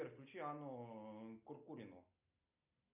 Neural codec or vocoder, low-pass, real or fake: none; 3.6 kHz; real